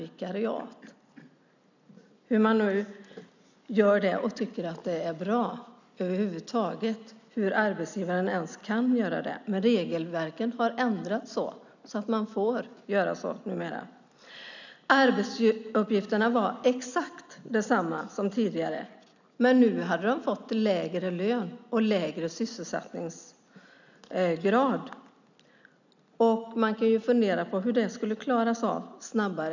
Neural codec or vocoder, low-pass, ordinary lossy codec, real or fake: none; 7.2 kHz; none; real